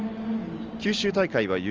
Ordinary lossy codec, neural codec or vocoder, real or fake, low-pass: Opus, 24 kbps; none; real; 7.2 kHz